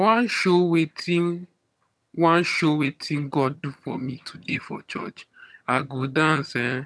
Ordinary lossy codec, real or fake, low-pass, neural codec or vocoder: none; fake; none; vocoder, 22.05 kHz, 80 mel bands, HiFi-GAN